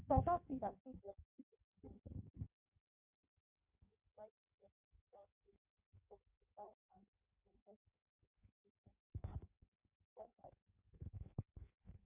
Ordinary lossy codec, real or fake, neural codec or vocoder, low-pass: none; fake; codec, 16 kHz in and 24 kHz out, 1.1 kbps, FireRedTTS-2 codec; 3.6 kHz